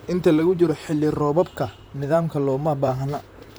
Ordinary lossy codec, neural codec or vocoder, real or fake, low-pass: none; vocoder, 44.1 kHz, 128 mel bands, Pupu-Vocoder; fake; none